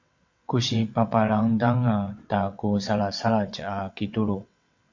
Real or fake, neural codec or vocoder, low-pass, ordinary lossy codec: fake; vocoder, 22.05 kHz, 80 mel bands, WaveNeXt; 7.2 kHz; MP3, 48 kbps